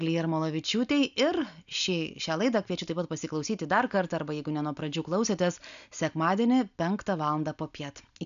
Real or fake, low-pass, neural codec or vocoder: real; 7.2 kHz; none